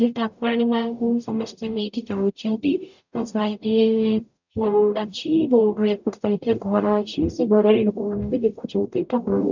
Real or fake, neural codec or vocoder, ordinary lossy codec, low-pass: fake; codec, 44.1 kHz, 0.9 kbps, DAC; none; 7.2 kHz